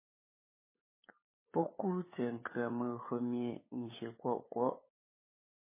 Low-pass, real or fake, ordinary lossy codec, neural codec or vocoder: 3.6 kHz; fake; MP3, 16 kbps; codec, 16 kHz, 8 kbps, FunCodec, trained on LibriTTS, 25 frames a second